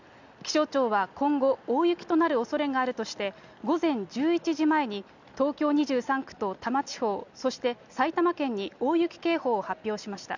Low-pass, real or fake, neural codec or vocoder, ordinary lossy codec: 7.2 kHz; real; none; none